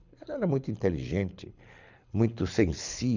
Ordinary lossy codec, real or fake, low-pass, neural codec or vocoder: none; fake; 7.2 kHz; codec, 24 kHz, 6 kbps, HILCodec